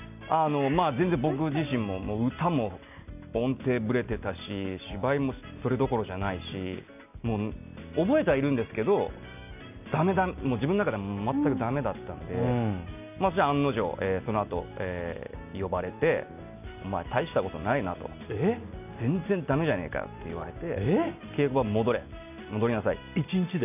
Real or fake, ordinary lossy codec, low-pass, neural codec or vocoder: real; none; 3.6 kHz; none